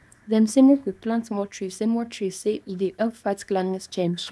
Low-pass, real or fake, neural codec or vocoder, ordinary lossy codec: none; fake; codec, 24 kHz, 0.9 kbps, WavTokenizer, small release; none